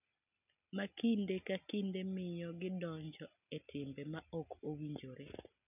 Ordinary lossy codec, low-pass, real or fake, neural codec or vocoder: none; 3.6 kHz; real; none